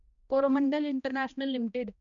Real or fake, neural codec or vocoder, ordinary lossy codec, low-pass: fake; codec, 16 kHz, 1 kbps, X-Codec, HuBERT features, trained on balanced general audio; none; 7.2 kHz